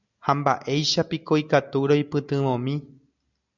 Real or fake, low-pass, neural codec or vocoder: real; 7.2 kHz; none